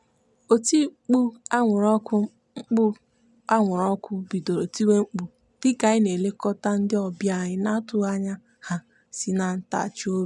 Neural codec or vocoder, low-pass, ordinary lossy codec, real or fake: none; 10.8 kHz; none; real